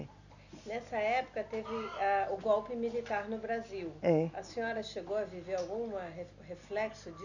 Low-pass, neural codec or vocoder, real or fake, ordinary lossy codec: 7.2 kHz; none; real; none